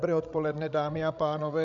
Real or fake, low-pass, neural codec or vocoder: fake; 7.2 kHz; codec, 16 kHz, 16 kbps, FreqCodec, larger model